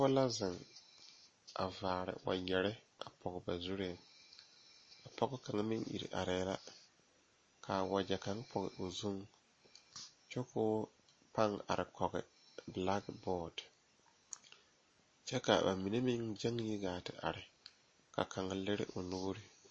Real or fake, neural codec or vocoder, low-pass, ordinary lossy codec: real; none; 9.9 kHz; MP3, 32 kbps